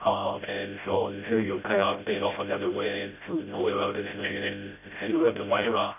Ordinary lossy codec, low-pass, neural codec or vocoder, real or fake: none; 3.6 kHz; codec, 16 kHz, 0.5 kbps, FreqCodec, smaller model; fake